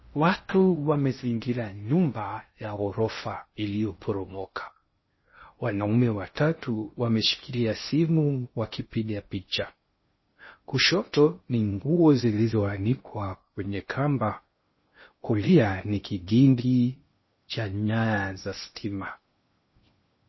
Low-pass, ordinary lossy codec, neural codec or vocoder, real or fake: 7.2 kHz; MP3, 24 kbps; codec, 16 kHz in and 24 kHz out, 0.6 kbps, FocalCodec, streaming, 2048 codes; fake